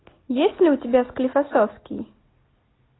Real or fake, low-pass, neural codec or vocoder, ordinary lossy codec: real; 7.2 kHz; none; AAC, 16 kbps